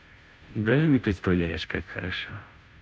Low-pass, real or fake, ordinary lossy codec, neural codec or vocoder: none; fake; none; codec, 16 kHz, 0.5 kbps, FunCodec, trained on Chinese and English, 25 frames a second